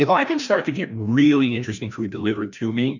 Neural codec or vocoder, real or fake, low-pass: codec, 16 kHz, 1 kbps, FreqCodec, larger model; fake; 7.2 kHz